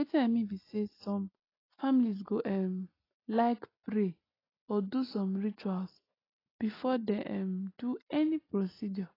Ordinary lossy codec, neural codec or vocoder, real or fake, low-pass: AAC, 24 kbps; none; real; 5.4 kHz